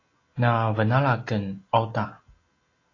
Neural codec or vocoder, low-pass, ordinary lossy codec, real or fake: none; 7.2 kHz; AAC, 32 kbps; real